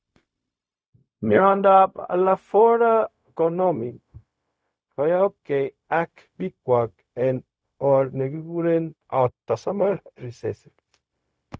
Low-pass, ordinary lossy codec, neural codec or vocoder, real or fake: none; none; codec, 16 kHz, 0.4 kbps, LongCat-Audio-Codec; fake